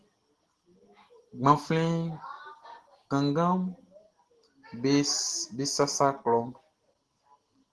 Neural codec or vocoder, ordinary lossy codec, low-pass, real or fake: none; Opus, 16 kbps; 10.8 kHz; real